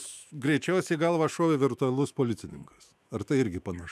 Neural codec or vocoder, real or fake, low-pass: autoencoder, 48 kHz, 128 numbers a frame, DAC-VAE, trained on Japanese speech; fake; 14.4 kHz